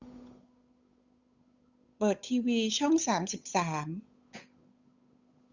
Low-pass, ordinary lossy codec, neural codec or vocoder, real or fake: 7.2 kHz; Opus, 64 kbps; codec, 16 kHz, 8 kbps, FunCodec, trained on Chinese and English, 25 frames a second; fake